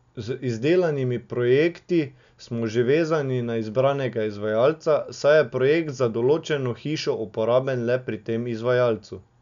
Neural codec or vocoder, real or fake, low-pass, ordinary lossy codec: none; real; 7.2 kHz; none